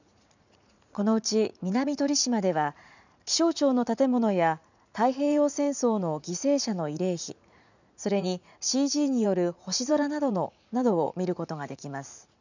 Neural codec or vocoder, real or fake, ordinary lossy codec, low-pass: vocoder, 44.1 kHz, 80 mel bands, Vocos; fake; none; 7.2 kHz